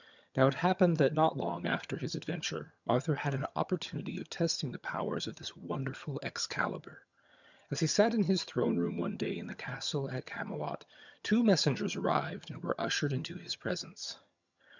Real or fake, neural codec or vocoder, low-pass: fake; vocoder, 22.05 kHz, 80 mel bands, HiFi-GAN; 7.2 kHz